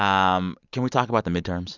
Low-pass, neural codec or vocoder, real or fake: 7.2 kHz; none; real